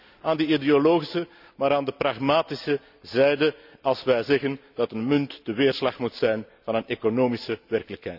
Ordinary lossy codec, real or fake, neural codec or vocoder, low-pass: none; real; none; 5.4 kHz